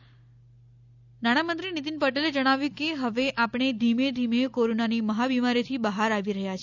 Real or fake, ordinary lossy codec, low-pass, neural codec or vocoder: real; none; 7.2 kHz; none